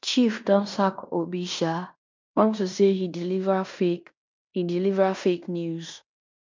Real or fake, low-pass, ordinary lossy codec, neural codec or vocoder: fake; 7.2 kHz; MP3, 64 kbps; codec, 16 kHz in and 24 kHz out, 0.9 kbps, LongCat-Audio-Codec, fine tuned four codebook decoder